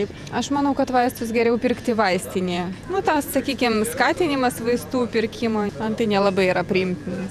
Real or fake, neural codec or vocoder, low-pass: fake; vocoder, 48 kHz, 128 mel bands, Vocos; 14.4 kHz